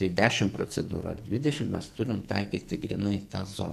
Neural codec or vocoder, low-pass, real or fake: codec, 44.1 kHz, 2.6 kbps, SNAC; 14.4 kHz; fake